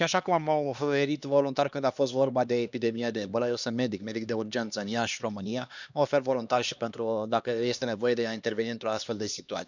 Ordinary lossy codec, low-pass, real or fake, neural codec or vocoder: none; 7.2 kHz; fake; codec, 16 kHz, 2 kbps, X-Codec, HuBERT features, trained on LibriSpeech